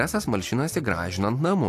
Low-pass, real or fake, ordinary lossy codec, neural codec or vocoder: 14.4 kHz; real; AAC, 64 kbps; none